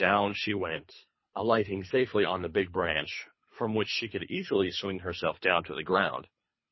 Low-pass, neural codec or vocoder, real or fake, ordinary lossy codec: 7.2 kHz; codec, 24 kHz, 3 kbps, HILCodec; fake; MP3, 24 kbps